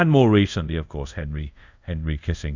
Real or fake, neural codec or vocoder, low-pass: fake; codec, 24 kHz, 0.5 kbps, DualCodec; 7.2 kHz